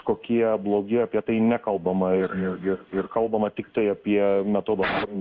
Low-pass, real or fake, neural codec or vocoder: 7.2 kHz; fake; codec, 16 kHz in and 24 kHz out, 1 kbps, XY-Tokenizer